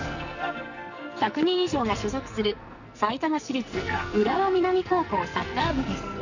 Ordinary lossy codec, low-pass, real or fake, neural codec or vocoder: none; 7.2 kHz; fake; codec, 44.1 kHz, 2.6 kbps, SNAC